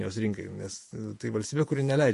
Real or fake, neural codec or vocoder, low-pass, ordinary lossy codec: fake; vocoder, 48 kHz, 128 mel bands, Vocos; 14.4 kHz; MP3, 48 kbps